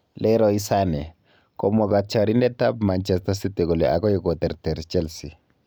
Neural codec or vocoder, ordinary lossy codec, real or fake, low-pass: none; none; real; none